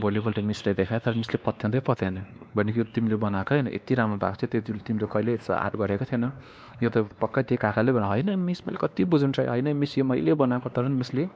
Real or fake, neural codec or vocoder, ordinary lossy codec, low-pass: fake; codec, 16 kHz, 2 kbps, X-Codec, WavLM features, trained on Multilingual LibriSpeech; none; none